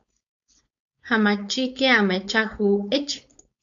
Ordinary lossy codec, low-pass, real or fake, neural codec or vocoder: MP3, 64 kbps; 7.2 kHz; fake; codec, 16 kHz, 4.8 kbps, FACodec